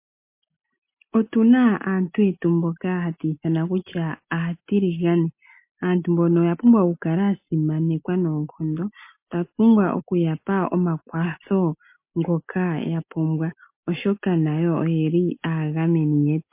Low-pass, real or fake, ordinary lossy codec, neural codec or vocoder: 3.6 kHz; real; MP3, 24 kbps; none